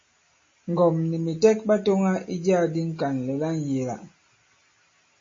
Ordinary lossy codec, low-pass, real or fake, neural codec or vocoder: MP3, 32 kbps; 7.2 kHz; real; none